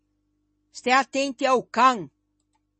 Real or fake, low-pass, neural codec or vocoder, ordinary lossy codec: real; 10.8 kHz; none; MP3, 32 kbps